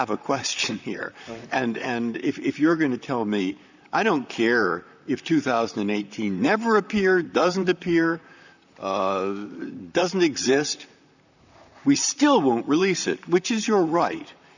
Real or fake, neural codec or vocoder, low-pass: fake; vocoder, 44.1 kHz, 128 mel bands, Pupu-Vocoder; 7.2 kHz